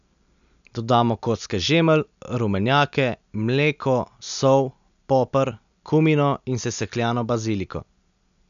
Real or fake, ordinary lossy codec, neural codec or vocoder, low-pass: real; none; none; 7.2 kHz